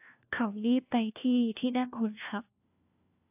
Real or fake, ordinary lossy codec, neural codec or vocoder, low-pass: fake; AAC, 32 kbps; codec, 16 kHz, 1 kbps, FunCodec, trained on LibriTTS, 50 frames a second; 3.6 kHz